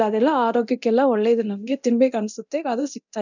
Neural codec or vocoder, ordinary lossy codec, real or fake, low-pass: codec, 24 kHz, 0.9 kbps, DualCodec; none; fake; 7.2 kHz